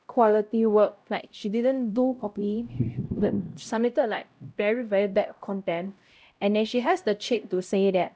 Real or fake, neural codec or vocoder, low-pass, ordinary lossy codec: fake; codec, 16 kHz, 0.5 kbps, X-Codec, HuBERT features, trained on LibriSpeech; none; none